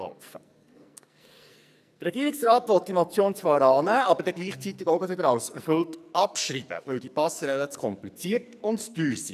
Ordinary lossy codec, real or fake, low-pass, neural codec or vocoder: none; fake; 14.4 kHz; codec, 44.1 kHz, 2.6 kbps, SNAC